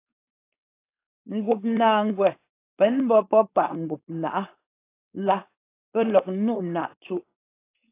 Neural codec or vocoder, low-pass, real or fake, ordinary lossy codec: codec, 16 kHz, 4.8 kbps, FACodec; 3.6 kHz; fake; AAC, 24 kbps